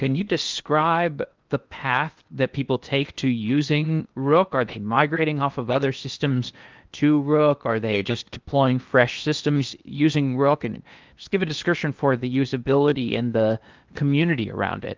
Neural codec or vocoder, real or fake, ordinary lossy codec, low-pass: codec, 16 kHz in and 24 kHz out, 0.8 kbps, FocalCodec, streaming, 65536 codes; fake; Opus, 24 kbps; 7.2 kHz